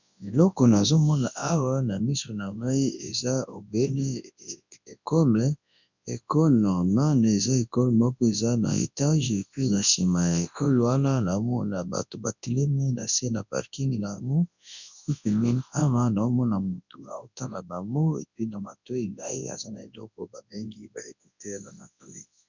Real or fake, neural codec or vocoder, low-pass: fake; codec, 24 kHz, 0.9 kbps, WavTokenizer, large speech release; 7.2 kHz